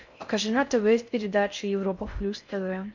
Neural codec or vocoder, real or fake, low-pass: codec, 16 kHz in and 24 kHz out, 0.6 kbps, FocalCodec, streaming, 4096 codes; fake; 7.2 kHz